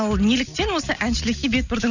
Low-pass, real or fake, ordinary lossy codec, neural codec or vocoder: 7.2 kHz; real; none; none